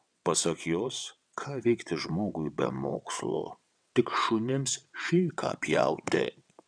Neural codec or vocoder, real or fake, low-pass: vocoder, 22.05 kHz, 80 mel bands, WaveNeXt; fake; 9.9 kHz